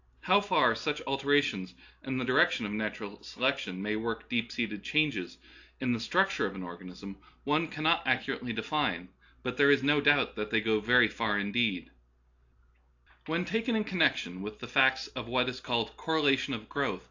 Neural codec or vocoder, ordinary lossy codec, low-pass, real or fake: none; AAC, 48 kbps; 7.2 kHz; real